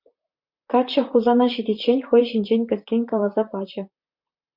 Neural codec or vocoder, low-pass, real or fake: vocoder, 44.1 kHz, 128 mel bands, Pupu-Vocoder; 5.4 kHz; fake